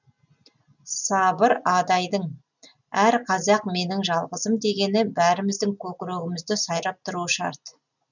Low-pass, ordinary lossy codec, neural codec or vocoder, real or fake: 7.2 kHz; none; none; real